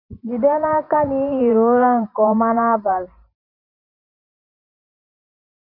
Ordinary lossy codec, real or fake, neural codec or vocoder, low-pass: none; fake; vocoder, 44.1 kHz, 128 mel bands every 512 samples, BigVGAN v2; 5.4 kHz